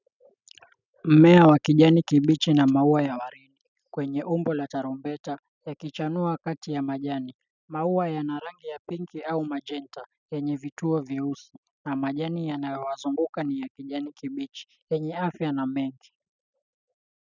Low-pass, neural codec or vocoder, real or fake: 7.2 kHz; none; real